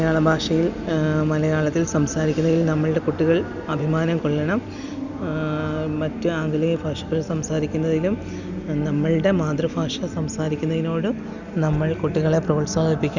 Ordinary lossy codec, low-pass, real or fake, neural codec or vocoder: none; 7.2 kHz; real; none